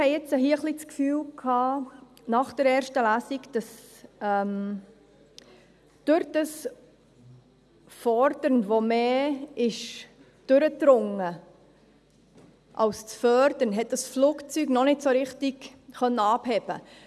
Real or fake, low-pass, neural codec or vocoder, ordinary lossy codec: real; none; none; none